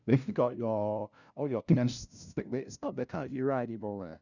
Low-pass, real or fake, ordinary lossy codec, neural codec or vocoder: 7.2 kHz; fake; none; codec, 16 kHz, 0.5 kbps, FunCodec, trained on LibriTTS, 25 frames a second